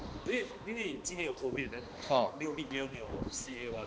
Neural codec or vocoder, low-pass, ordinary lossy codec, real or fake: codec, 16 kHz, 2 kbps, X-Codec, HuBERT features, trained on balanced general audio; none; none; fake